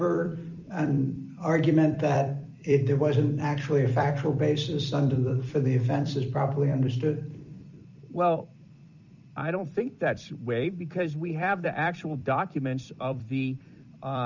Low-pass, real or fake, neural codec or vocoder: 7.2 kHz; fake; vocoder, 44.1 kHz, 128 mel bands every 256 samples, BigVGAN v2